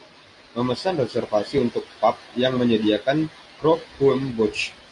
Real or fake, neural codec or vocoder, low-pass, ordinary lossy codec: real; none; 10.8 kHz; AAC, 64 kbps